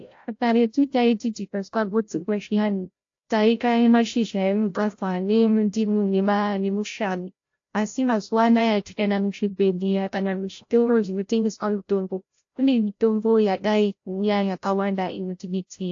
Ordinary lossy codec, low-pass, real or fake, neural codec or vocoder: AAC, 48 kbps; 7.2 kHz; fake; codec, 16 kHz, 0.5 kbps, FreqCodec, larger model